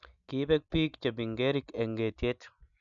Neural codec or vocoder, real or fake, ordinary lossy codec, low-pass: none; real; none; 7.2 kHz